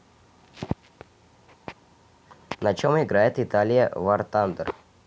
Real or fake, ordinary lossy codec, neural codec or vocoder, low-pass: real; none; none; none